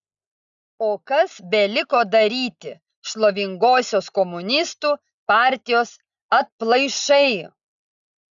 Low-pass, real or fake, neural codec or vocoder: 7.2 kHz; real; none